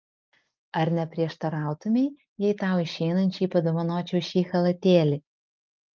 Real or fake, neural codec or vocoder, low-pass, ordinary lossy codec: real; none; 7.2 kHz; Opus, 24 kbps